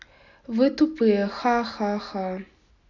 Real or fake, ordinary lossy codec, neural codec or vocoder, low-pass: real; none; none; 7.2 kHz